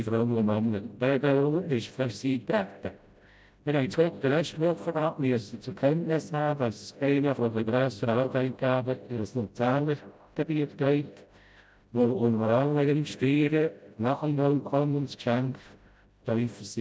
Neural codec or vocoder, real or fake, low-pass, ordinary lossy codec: codec, 16 kHz, 0.5 kbps, FreqCodec, smaller model; fake; none; none